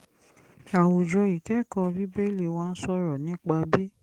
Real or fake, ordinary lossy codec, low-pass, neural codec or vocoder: fake; Opus, 24 kbps; 19.8 kHz; codec, 44.1 kHz, 7.8 kbps, DAC